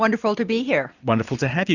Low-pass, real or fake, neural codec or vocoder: 7.2 kHz; real; none